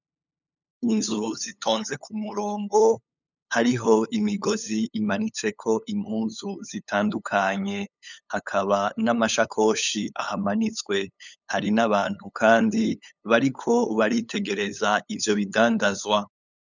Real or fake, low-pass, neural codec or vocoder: fake; 7.2 kHz; codec, 16 kHz, 8 kbps, FunCodec, trained on LibriTTS, 25 frames a second